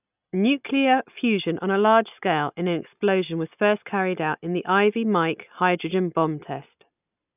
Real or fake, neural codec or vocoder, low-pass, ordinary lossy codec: real; none; 3.6 kHz; none